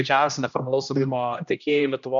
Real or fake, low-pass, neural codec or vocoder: fake; 7.2 kHz; codec, 16 kHz, 1 kbps, X-Codec, HuBERT features, trained on general audio